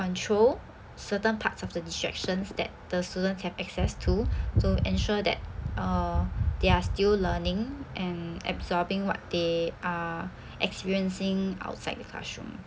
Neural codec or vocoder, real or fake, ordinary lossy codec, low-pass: none; real; none; none